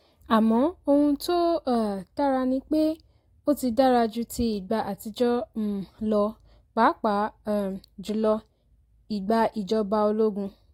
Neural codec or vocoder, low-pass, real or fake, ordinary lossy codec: none; 19.8 kHz; real; AAC, 48 kbps